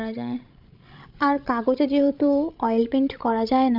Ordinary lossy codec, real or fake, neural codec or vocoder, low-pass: none; fake; codec, 16 kHz, 16 kbps, FreqCodec, larger model; 5.4 kHz